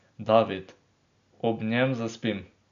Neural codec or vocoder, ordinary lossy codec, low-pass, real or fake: none; none; 7.2 kHz; real